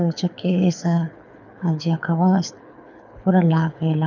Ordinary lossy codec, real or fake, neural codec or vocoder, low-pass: none; fake; codec, 24 kHz, 6 kbps, HILCodec; 7.2 kHz